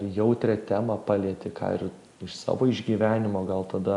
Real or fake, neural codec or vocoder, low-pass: real; none; 10.8 kHz